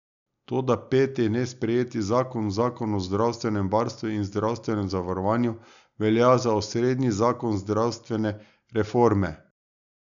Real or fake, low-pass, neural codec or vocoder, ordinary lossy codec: real; 7.2 kHz; none; none